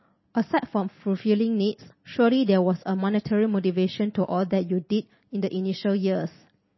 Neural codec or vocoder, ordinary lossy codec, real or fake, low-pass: none; MP3, 24 kbps; real; 7.2 kHz